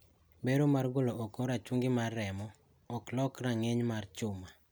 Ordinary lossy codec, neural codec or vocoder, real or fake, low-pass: none; none; real; none